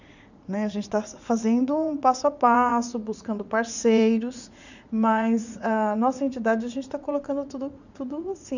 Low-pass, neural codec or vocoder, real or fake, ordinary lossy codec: 7.2 kHz; vocoder, 44.1 kHz, 80 mel bands, Vocos; fake; none